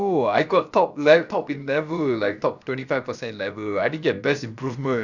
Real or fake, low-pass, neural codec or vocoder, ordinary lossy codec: fake; 7.2 kHz; codec, 16 kHz, about 1 kbps, DyCAST, with the encoder's durations; none